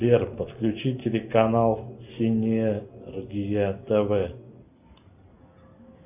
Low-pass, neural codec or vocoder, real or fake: 3.6 kHz; none; real